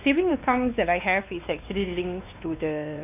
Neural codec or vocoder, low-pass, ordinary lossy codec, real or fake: codec, 16 kHz, 2 kbps, X-Codec, WavLM features, trained on Multilingual LibriSpeech; 3.6 kHz; none; fake